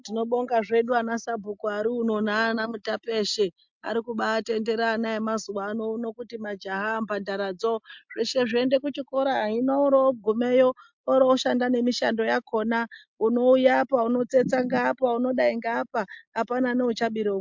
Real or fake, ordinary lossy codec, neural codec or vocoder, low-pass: real; MP3, 64 kbps; none; 7.2 kHz